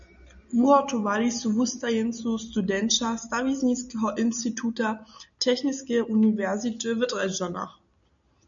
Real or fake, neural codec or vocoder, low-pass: real; none; 7.2 kHz